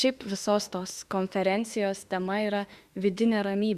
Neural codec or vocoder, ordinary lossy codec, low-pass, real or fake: autoencoder, 48 kHz, 32 numbers a frame, DAC-VAE, trained on Japanese speech; Opus, 64 kbps; 14.4 kHz; fake